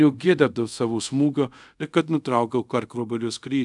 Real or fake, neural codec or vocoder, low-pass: fake; codec, 24 kHz, 0.5 kbps, DualCodec; 10.8 kHz